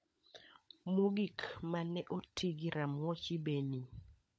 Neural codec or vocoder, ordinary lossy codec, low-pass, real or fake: codec, 16 kHz, 16 kbps, FunCodec, trained on LibriTTS, 50 frames a second; none; none; fake